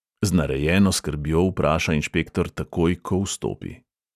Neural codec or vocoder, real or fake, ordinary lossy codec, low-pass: none; real; none; 14.4 kHz